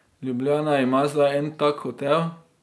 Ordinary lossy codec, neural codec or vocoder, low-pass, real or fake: none; none; none; real